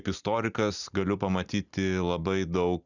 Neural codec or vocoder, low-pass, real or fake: none; 7.2 kHz; real